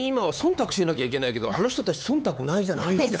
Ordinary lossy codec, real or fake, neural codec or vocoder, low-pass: none; fake; codec, 16 kHz, 4 kbps, X-Codec, HuBERT features, trained on LibriSpeech; none